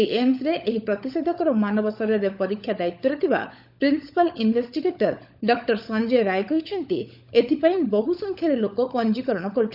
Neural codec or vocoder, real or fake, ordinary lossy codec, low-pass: codec, 16 kHz, 16 kbps, FunCodec, trained on LibriTTS, 50 frames a second; fake; none; 5.4 kHz